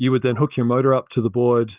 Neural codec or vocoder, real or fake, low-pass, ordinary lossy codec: none; real; 3.6 kHz; Opus, 32 kbps